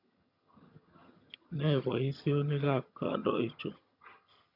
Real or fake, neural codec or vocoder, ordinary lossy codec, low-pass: fake; vocoder, 22.05 kHz, 80 mel bands, HiFi-GAN; none; 5.4 kHz